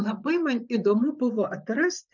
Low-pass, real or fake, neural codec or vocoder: 7.2 kHz; fake; vocoder, 44.1 kHz, 128 mel bands, Pupu-Vocoder